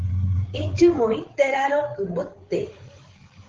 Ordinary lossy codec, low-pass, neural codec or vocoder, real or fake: Opus, 16 kbps; 7.2 kHz; codec, 16 kHz, 8 kbps, FreqCodec, larger model; fake